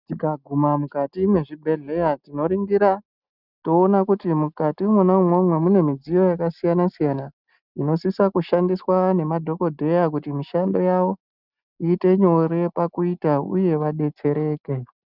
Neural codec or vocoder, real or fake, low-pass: none; real; 5.4 kHz